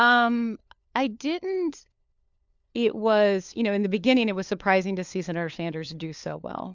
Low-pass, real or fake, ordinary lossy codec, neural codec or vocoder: 7.2 kHz; fake; MP3, 64 kbps; codec, 16 kHz, 4 kbps, FunCodec, trained on LibriTTS, 50 frames a second